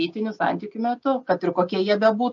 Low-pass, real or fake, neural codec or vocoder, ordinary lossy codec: 7.2 kHz; real; none; MP3, 48 kbps